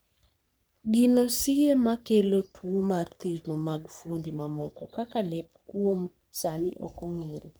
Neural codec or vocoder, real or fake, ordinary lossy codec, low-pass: codec, 44.1 kHz, 3.4 kbps, Pupu-Codec; fake; none; none